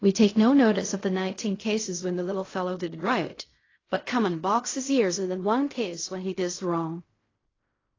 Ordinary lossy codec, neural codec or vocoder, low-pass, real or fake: AAC, 32 kbps; codec, 16 kHz in and 24 kHz out, 0.4 kbps, LongCat-Audio-Codec, fine tuned four codebook decoder; 7.2 kHz; fake